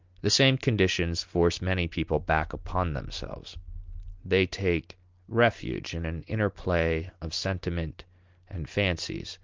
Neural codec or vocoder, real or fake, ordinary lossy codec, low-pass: none; real; Opus, 32 kbps; 7.2 kHz